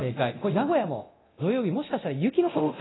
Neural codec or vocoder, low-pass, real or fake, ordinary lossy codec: codec, 24 kHz, 0.9 kbps, DualCodec; 7.2 kHz; fake; AAC, 16 kbps